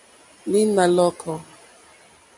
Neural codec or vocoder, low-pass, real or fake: none; 10.8 kHz; real